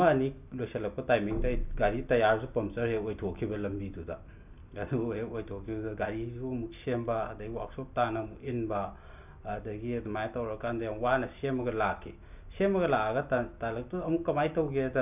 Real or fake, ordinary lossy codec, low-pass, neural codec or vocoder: real; none; 3.6 kHz; none